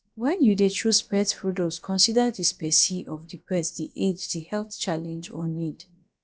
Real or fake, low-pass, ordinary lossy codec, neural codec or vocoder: fake; none; none; codec, 16 kHz, about 1 kbps, DyCAST, with the encoder's durations